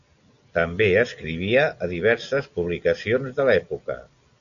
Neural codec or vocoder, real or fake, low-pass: none; real; 7.2 kHz